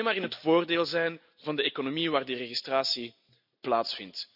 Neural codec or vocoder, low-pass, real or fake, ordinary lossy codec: none; 5.4 kHz; real; none